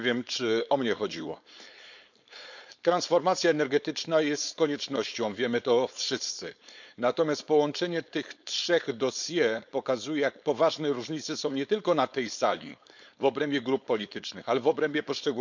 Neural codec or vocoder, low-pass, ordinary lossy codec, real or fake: codec, 16 kHz, 4.8 kbps, FACodec; 7.2 kHz; none; fake